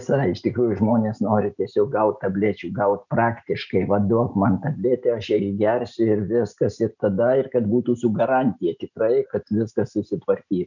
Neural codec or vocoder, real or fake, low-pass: none; real; 7.2 kHz